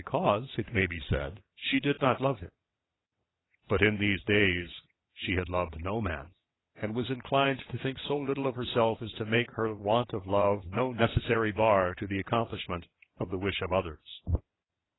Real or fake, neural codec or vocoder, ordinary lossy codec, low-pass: fake; vocoder, 22.05 kHz, 80 mel bands, WaveNeXt; AAC, 16 kbps; 7.2 kHz